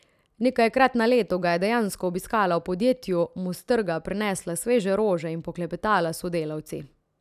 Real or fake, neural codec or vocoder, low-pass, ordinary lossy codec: real; none; 14.4 kHz; none